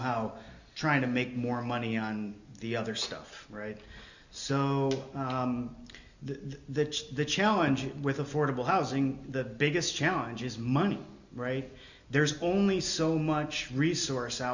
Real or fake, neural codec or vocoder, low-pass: real; none; 7.2 kHz